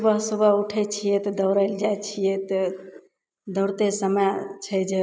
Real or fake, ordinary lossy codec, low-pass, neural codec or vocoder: real; none; none; none